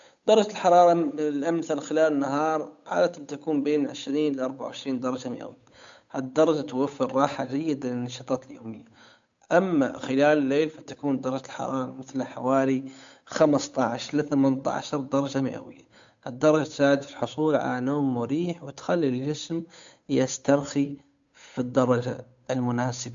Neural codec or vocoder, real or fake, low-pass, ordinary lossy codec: codec, 16 kHz, 8 kbps, FunCodec, trained on Chinese and English, 25 frames a second; fake; 7.2 kHz; AAC, 64 kbps